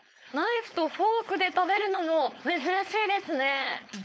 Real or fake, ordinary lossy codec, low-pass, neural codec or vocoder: fake; none; none; codec, 16 kHz, 4.8 kbps, FACodec